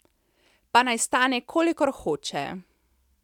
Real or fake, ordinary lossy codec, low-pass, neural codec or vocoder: real; none; 19.8 kHz; none